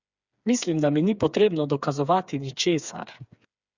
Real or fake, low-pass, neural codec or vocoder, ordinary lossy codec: fake; 7.2 kHz; codec, 16 kHz, 4 kbps, FreqCodec, smaller model; Opus, 64 kbps